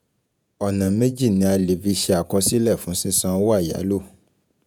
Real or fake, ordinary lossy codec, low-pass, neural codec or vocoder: fake; none; none; vocoder, 48 kHz, 128 mel bands, Vocos